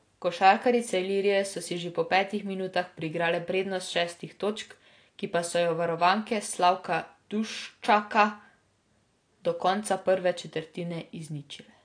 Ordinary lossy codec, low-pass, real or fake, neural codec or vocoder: AAC, 48 kbps; 9.9 kHz; real; none